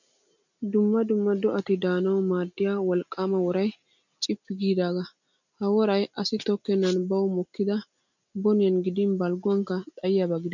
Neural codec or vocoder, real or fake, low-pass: none; real; 7.2 kHz